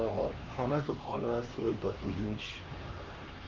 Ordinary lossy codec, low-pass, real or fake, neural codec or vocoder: Opus, 24 kbps; 7.2 kHz; fake; codec, 16 kHz, 2 kbps, X-Codec, HuBERT features, trained on LibriSpeech